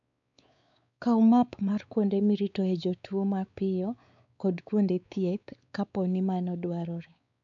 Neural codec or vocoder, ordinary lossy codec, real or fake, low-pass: codec, 16 kHz, 4 kbps, X-Codec, WavLM features, trained on Multilingual LibriSpeech; none; fake; 7.2 kHz